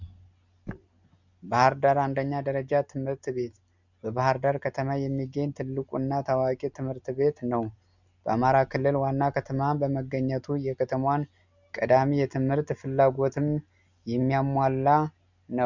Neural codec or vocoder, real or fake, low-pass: none; real; 7.2 kHz